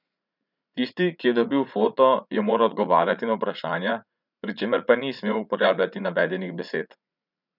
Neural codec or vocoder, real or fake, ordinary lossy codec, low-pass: vocoder, 44.1 kHz, 80 mel bands, Vocos; fake; none; 5.4 kHz